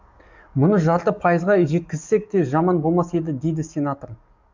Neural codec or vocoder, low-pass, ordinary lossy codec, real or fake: codec, 44.1 kHz, 7.8 kbps, DAC; 7.2 kHz; MP3, 64 kbps; fake